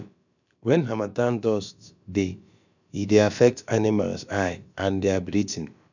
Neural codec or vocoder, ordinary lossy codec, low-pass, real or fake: codec, 16 kHz, about 1 kbps, DyCAST, with the encoder's durations; none; 7.2 kHz; fake